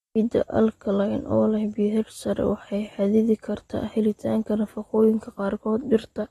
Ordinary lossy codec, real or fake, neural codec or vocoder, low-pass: AAC, 32 kbps; real; none; 19.8 kHz